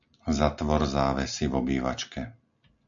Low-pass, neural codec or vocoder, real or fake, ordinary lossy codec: 7.2 kHz; none; real; MP3, 64 kbps